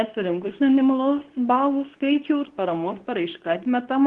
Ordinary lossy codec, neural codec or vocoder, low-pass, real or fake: Opus, 16 kbps; codec, 24 kHz, 0.9 kbps, WavTokenizer, medium speech release version 2; 10.8 kHz; fake